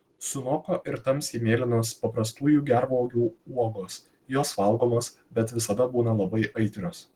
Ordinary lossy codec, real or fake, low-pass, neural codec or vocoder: Opus, 16 kbps; real; 19.8 kHz; none